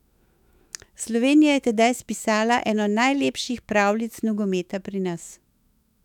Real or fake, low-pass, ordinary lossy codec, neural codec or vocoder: fake; 19.8 kHz; none; autoencoder, 48 kHz, 128 numbers a frame, DAC-VAE, trained on Japanese speech